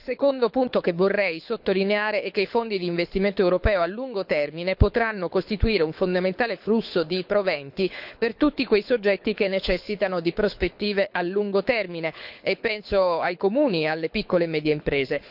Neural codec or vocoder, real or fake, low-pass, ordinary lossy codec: codec, 24 kHz, 6 kbps, HILCodec; fake; 5.4 kHz; none